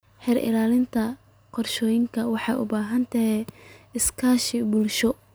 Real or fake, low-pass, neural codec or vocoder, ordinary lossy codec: real; none; none; none